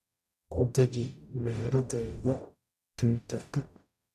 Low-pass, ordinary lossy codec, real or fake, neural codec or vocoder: 14.4 kHz; none; fake; codec, 44.1 kHz, 0.9 kbps, DAC